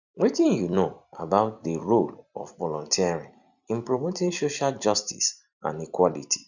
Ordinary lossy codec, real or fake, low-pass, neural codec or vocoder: none; fake; 7.2 kHz; vocoder, 44.1 kHz, 128 mel bands every 256 samples, BigVGAN v2